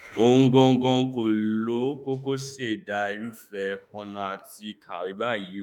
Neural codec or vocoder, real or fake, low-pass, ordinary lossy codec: autoencoder, 48 kHz, 32 numbers a frame, DAC-VAE, trained on Japanese speech; fake; 19.8 kHz; none